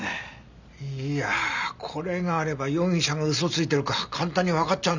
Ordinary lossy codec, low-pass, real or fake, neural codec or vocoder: none; 7.2 kHz; real; none